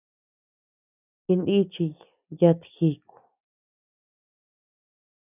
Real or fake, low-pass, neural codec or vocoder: real; 3.6 kHz; none